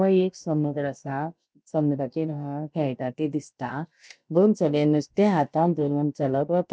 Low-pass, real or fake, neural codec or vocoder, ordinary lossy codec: none; fake; codec, 16 kHz, about 1 kbps, DyCAST, with the encoder's durations; none